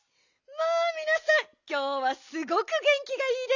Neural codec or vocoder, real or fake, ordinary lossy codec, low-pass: none; real; none; 7.2 kHz